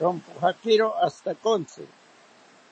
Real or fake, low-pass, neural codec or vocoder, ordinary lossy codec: real; 9.9 kHz; none; MP3, 32 kbps